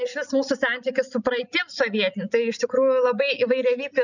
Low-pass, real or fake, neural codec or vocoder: 7.2 kHz; real; none